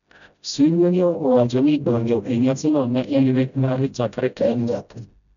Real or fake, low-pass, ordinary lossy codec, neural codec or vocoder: fake; 7.2 kHz; none; codec, 16 kHz, 0.5 kbps, FreqCodec, smaller model